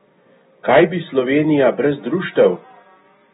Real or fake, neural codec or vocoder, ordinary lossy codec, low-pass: real; none; AAC, 16 kbps; 19.8 kHz